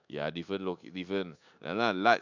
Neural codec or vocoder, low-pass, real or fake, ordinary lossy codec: codec, 24 kHz, 1.2 kbps, DualCodec; 7.2 kHz; fake; none